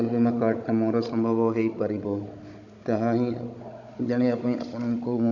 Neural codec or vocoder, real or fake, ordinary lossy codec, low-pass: codec, 16 kHz, 16 kbps, FunCodec, trained on Chinese and English, 50 frames a second; fake; AAC, 48 kbps; 7.2 kHz